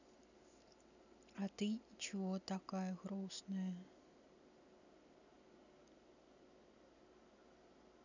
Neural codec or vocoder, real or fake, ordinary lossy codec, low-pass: none; real; none; 7.2 kHz